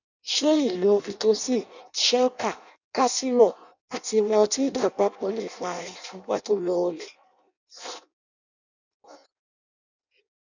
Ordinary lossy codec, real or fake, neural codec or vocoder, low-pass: none; fake; codec, 16 kHz in and 24 kHz out, 0.6 kbps, FireRedTTS-2 codec; 7.2 kHz